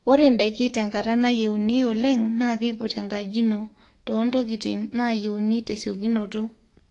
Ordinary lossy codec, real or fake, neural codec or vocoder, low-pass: AAC, 48 kbps; fake; codec, 32 kHz, 1.9 kbps, SNAC; 10.8 kHz